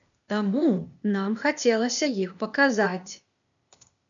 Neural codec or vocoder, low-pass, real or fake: codec, 16 kHz, 0.8 kbps, ZipCodec; 7.2 kHz; fake